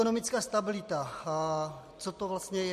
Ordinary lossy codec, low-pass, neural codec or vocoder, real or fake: MP3, 64 kbps; 14.4 kHz; none; real